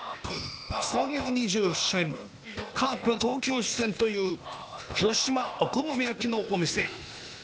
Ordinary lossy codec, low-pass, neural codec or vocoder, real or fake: none; none; codec, 16 kHz, 0.8 kbps, ZipCodec; fake